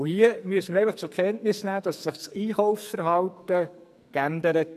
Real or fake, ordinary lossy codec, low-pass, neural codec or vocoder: fake; none; 14.4 kHz; codec, 44.1 kHz, 2.6 kbps, SNAC